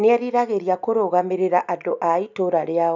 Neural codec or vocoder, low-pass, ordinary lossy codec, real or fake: none; 7.2 kHz; none; real